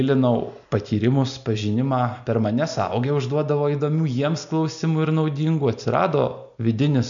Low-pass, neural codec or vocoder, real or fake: 7.2 kHz; none; real